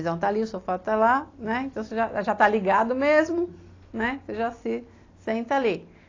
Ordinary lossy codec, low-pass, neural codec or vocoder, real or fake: AAC, 32 kbps; 7.2 kHz; none; real